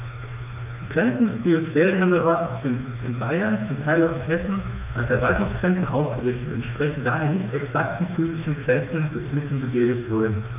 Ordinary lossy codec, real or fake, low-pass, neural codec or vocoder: none; fake; 3.6 kHz; codec, 16 kHz, 2 kbps, FreqCodec, smaller model